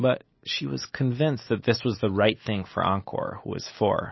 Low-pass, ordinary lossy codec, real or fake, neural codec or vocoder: 7.2 kHz; MP3, 24 kbps; real; none